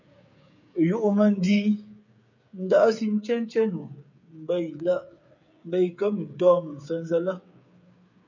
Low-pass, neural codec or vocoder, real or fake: 7.2 kHz; codec, 16 kHz, 8 kbps, FreqCodec, smaller model; fake